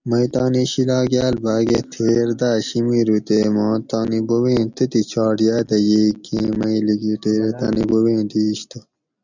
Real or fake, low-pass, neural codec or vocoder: real; 7.2 kHz; none